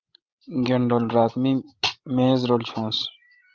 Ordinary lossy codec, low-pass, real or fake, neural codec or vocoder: Opus, 32 kbps; 7.2 kHz; fake; codec, 16 kHz, 16 kbps, FreqCodec, larger model